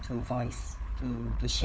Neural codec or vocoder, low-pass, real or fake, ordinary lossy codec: codec, 16 kHz, 4 kbps, FunCodec, trained on LibriTTS, 50 frames a second; none; fake; none